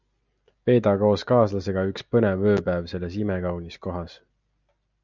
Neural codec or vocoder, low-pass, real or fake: none; 7.2 kHz; real